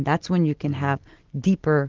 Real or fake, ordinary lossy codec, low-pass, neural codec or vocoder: real; Opus, 16 kbps; 7.2 kHz; none